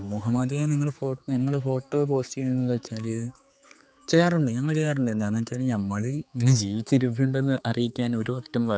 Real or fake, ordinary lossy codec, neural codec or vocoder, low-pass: fake; none; codec, 16 kHz, 4 kbps, X-Codec, HuBERT features, trained on general audio; none